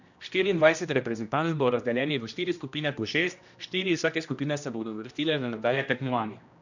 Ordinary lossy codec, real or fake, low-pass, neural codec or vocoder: none; fake; 7.2 kHz; codec, 16 kHz, 1 kbps, X-Codec, HuBERT features, trained on general audio